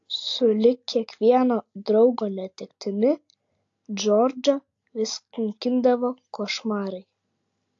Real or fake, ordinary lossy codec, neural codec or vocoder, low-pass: real; AAC, 48 kbps; none; 7.2 kHz